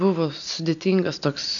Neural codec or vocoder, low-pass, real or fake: none; 7.2 kHz; real